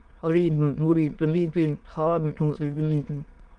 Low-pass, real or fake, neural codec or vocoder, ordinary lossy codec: 9.9 kHz; fake; autoencoder, 22.05 kHz, a latent of 192 numbers a frame, VITS, trained on many speakers; Opus, 24 kbps